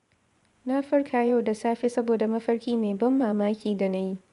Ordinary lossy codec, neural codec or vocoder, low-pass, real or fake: none; vocoder, 24 kHz, 100 mel bands, Vocos; 10.8 kHz; fake